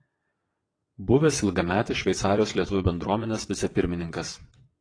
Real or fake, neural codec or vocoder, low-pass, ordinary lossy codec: fake; vocoder, 22.05 kHz, 80 mel bands, WaveNeXt; 9.9 kHz; AAC, 32 kbps